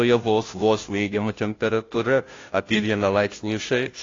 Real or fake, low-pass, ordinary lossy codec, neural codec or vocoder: fake; 7.2 kHz; AAC, 32 kbps; codec, 16 kHz, 0.5 kbps, FunCodec, trained on Chinese and English, 25 frames a second